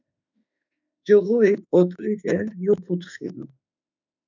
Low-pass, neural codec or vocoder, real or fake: 7.2 kHz; autoencoder, 48 kHz, 32 numbers a frame, DAC-VAE, trained on Japanese speech; fake